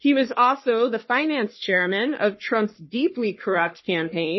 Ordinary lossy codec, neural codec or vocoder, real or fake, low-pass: MP3, 24 kbps; codec, 44.1 kHz, 3.4 kbps, Pupu-Codec; fake; 7.2 kHz